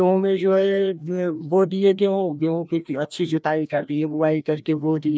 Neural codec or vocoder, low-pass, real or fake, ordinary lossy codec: codec, 16 kHz, 1 kbps, FreqCodec, larger model; none; fake; none